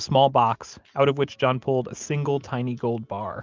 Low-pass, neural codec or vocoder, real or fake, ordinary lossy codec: 7.2 kHz; none; real; Opus, 24 kbps